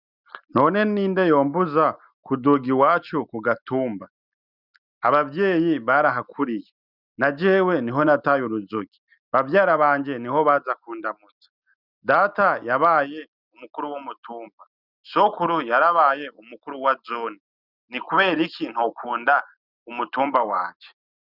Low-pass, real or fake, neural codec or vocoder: 5.4 kHz; real; none